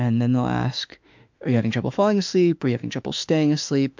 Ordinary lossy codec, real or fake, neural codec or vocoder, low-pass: MP3, 64 kbps; fake; autoencoder, 48 kHz, 32 numbers a frame, DAC-VAE, trained on Japanese speech; 7.2 kHz